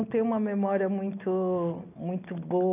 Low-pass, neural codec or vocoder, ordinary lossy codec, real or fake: 3.6 kHz; none; none; real